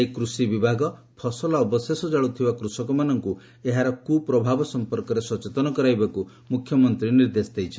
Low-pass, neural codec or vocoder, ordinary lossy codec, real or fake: none; none; none; real